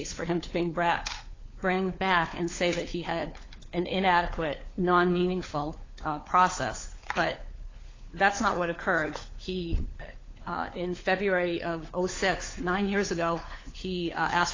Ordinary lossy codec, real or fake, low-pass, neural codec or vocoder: AAC, 32 kbps; fake; 7.2 kHz; codec, 16 kHz, 4 kbps, FunCodec, trained on LibriTTS, 50 frames a second